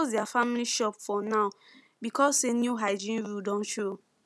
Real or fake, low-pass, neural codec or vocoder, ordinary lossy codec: real; none; none; none